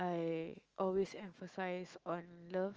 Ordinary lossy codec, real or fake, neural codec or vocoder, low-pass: Opus, 24 kbps; fake; autoencoder, 48 kHz, 128 numbers a frame, DAC-VAE, trained on Japanese speech; 7.2 kHz